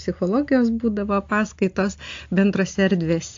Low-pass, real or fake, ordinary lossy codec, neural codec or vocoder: 7.2 kHz; real; AAC, 48 kbps; none